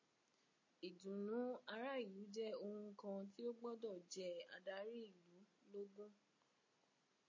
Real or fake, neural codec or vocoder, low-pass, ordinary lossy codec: real; none; 7.2 kHz; MP3, 32 kbps